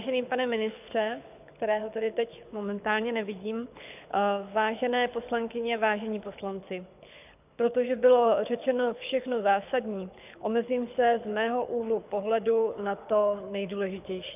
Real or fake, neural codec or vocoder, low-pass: fake; codec, 24 kHz, 6 kbps, HILCodec; 3.6 kHz